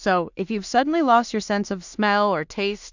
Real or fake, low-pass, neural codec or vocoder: fake; 7.2 kHz; codec, 16 kHz in and 24 kHz out, 0.4 kbps, LongCat-Audio-Codec, two codebook decoder